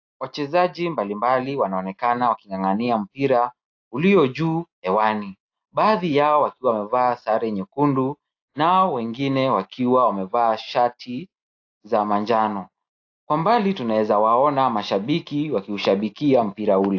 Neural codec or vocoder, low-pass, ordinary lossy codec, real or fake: none; 7.2 kHz; AAC, 48 kbps; real